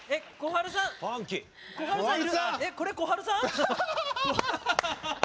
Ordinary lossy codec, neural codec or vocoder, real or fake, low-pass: none; none; real; none